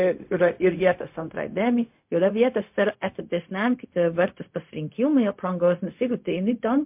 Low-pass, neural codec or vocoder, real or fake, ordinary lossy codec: 3.6 kHz; codec, 16 kHz, 0.4 kbps, LongCat-Audio-Codec; fake; MP3, 32 kbps